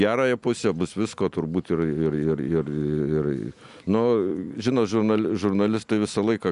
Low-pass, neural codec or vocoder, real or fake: 10.8 kHz; none; real